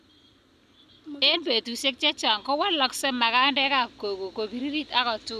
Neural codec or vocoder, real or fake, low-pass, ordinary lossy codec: none; real; 14.4 kHz; none